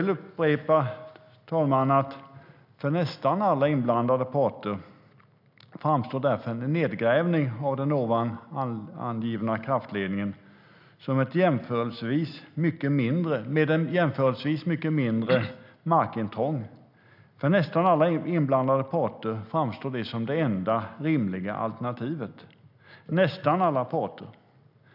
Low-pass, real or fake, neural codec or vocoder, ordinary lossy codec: 5.4 kHz; real; none; none